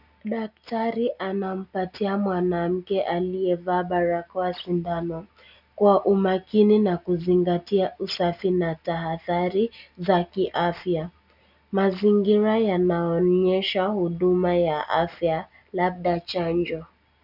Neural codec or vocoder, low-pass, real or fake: none; 5.4 kHz; real